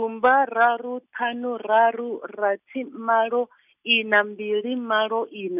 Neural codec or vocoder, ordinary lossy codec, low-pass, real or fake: none; none; 3.6 kHz; real